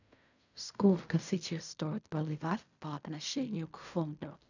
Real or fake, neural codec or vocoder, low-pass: fake; codec, 16 kHz in and 24 kHz out, 0.4 kbps, LongCat-Audio-Codec, fine tuned four codebook decoder; 7.2 kHz